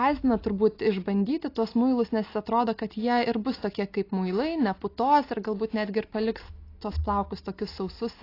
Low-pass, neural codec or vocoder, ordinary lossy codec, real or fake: 5.4 kHz; none; AAC, 32 kbps; real